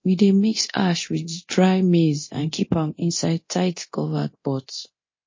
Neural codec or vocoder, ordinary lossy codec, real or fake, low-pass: codec, 24 kHz, 0.9 kbps, DualCodec; MP3, 32 kbps; fake; 7.2 kHz